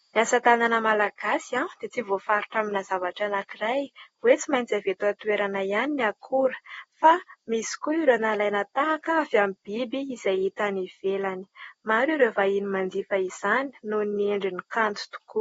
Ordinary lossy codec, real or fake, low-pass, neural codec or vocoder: AAC, 24 kbps; real; 14.4 kHz; none